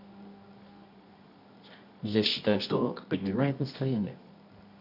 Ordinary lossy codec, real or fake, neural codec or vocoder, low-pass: none; fake; codec, 24 kHz, 0.9 kbps, WavTokenizer, medium music audio release; 5.4 kHz